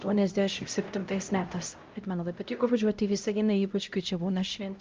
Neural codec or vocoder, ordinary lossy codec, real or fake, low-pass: codec, 16 kHz, 0.5 kbps, X-Codec, HuBERT features, trained on LibriSpeech; Opus, 24 kbps; fake; 7.2 kHz